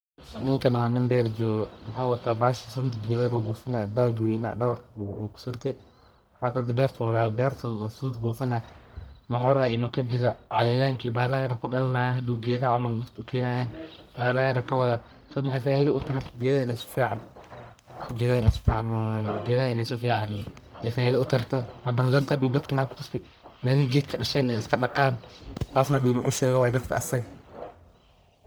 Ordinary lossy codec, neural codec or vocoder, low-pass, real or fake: none; codec, 44.1 kHz, 1.7 kbps, Pupu-Codec; none; fake